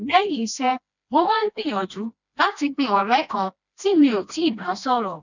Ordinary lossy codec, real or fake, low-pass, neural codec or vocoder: none; fake; 7.2 kHz; codec, 16 kHz, 1 kbps, FreqCodec, smaller model